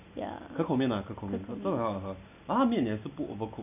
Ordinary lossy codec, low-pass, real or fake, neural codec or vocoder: none; 3.6 kHz; real; none